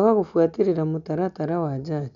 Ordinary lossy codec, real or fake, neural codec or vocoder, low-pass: none; real; none; 7.2 kHz